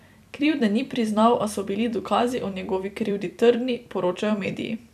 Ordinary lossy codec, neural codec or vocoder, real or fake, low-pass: none; vocoder, 44.1 kHz, 128 mel bands every 256 samples, BigVGAN v2; fake; 14.4 kHz